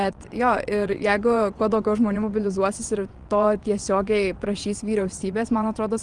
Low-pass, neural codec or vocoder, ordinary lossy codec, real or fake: 9.9 kHz; vocoder, 22.05 kHz, 80 mel bands, WaveNeXt; Opus, 24 kbps; fake